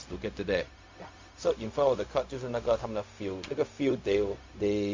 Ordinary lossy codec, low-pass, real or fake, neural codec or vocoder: MP3, 64 kbps; 7.2 kHz; fake; codec, 16 kHz, 0.4 kbps, LongCat-Audio-Codec